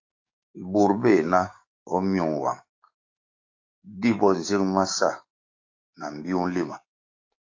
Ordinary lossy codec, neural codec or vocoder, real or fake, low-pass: AAC, 48 kbps; codec, 16 kHz, 6 kbps, DAC; fake; 7.2 kHz